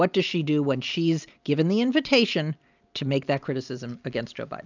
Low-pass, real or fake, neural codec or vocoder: 7.2 kHz; real; none